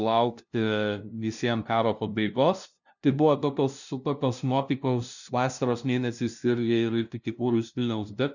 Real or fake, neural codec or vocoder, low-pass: fake; codec, 16 kHz, 0.5 kbps, FunCodec, trained on LibriTTS, 25 frames a second; 7.2 kHz